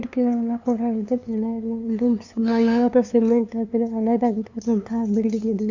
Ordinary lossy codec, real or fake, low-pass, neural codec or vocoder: none; fake; 7.2 kHz; codec, 16 kHz, 2 kbps, FunCodec, trained on LibriTTS, 25 frames a second